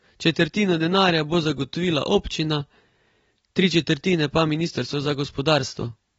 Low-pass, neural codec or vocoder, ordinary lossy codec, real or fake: 19.8 kHz; none; AAC, 24 kbps; real